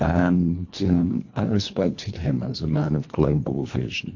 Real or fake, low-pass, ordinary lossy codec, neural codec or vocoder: fake; 7.2 kHz; AAC, 48 kbps; codec, 24 kHz, 1.5 kbps, HILCodec